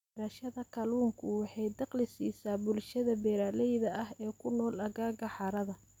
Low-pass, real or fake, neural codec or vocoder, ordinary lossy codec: 19.8 kHz; real; none; MP3, 96 kbps